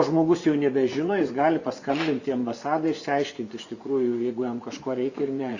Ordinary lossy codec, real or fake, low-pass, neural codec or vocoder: Opus, 64 kbps; fake; 7.2 kHz; vocoder, 24 kHz, 100 mel bands, Vocos